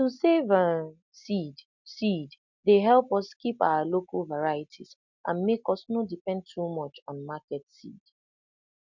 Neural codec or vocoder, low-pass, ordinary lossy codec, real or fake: none; 7.2 kHz; none; real